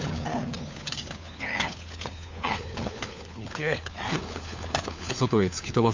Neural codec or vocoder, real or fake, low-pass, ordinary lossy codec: codec, 16 kHz, 4 kbps, FunCodec, trained on LibriTTS, 50 frames a second; fake; 7.2 kHz; MP3, 64 kbps